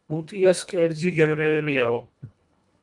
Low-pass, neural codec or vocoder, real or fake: 10.8 kHz; codec, 24 kHz, 1.5 kbps, HILCodec; fake